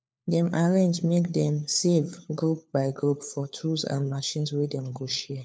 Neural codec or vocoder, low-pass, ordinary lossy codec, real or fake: codec, 16 kHz, 4 kbps, FunCodec, trained on LibriTTS, 50 frames a second; none; none; fake